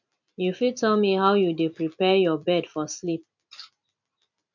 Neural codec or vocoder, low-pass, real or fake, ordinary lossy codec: vocoder, 44.1 kHz, 128 mel bands every 256 samples, BigVGAN v2; 7.2 kHz; fake; none